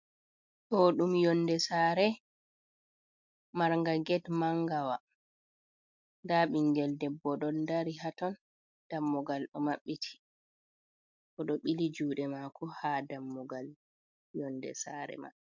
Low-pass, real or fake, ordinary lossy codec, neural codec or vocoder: 7.2 kHz; real; MP3, 64 kbps; none